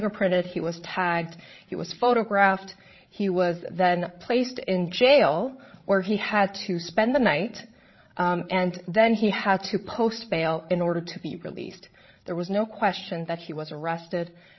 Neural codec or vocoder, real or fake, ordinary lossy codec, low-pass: codec, 16 kHz, 16 kbps, FreqCodec, larger model; fake; MP3, 24 kbps; 7.2 kHz